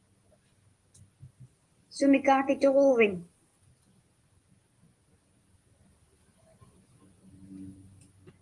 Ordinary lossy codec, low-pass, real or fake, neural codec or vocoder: Opus, 24 kbps; 10.8 kHz; real; none